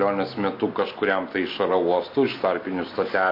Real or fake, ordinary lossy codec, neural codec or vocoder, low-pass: real; MP3, 32 kbps; none; 5.4 kHz